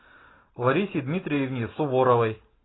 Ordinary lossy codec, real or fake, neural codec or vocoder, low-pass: AAC, 16 kbps; real; none; 7.2 kHz